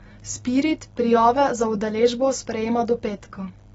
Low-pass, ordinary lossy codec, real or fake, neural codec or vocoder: 14.4 kHz; AAC, 24 kbps; real; none